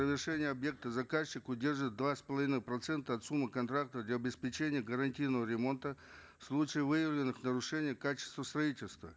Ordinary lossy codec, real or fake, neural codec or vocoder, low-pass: none; real; none; none